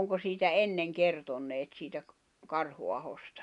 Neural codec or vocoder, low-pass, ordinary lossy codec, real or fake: none; 10.8 kHz; none; real